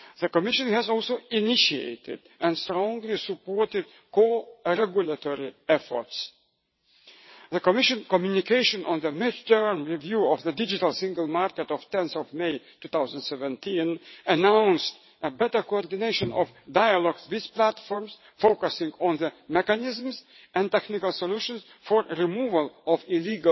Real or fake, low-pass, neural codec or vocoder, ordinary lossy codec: fake; 7.2 kHz; vocoder, 22.05 kHz, 80 mel bands, WaveNeXt; MP3, 24 kbps